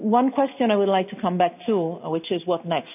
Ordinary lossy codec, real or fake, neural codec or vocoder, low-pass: none; real; none; 3.6 kHz